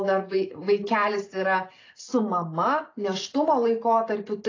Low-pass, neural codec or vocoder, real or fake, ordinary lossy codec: 7.2 kHz; none; real; AAC, 32 kbps